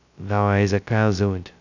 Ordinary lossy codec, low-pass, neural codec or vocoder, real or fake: none; 7.2 kHz; codec, 16 kHz, 0.2 kbps, FocalCodec; fake